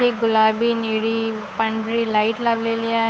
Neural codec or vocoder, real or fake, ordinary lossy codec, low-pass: codec, 16 kHz, 8 kbps, FunCodec, trained on Chinese and English, 25 frames a second; fake; none; none